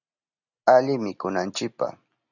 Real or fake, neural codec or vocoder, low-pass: real; none; 7.2 kHz